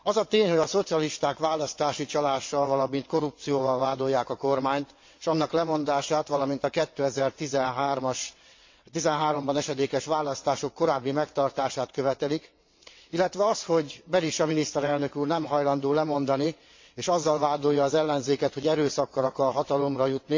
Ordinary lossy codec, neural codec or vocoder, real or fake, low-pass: MP3, 48 kbps; vocoder, 22.05 kHz, 80 mel bands, WaveNeXt; fake; 7.2 kHz